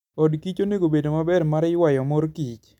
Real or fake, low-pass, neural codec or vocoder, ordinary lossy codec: real; 19.8 kHz; none; none